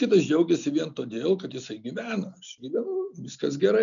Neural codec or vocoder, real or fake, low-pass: none; real; 7.2 kHz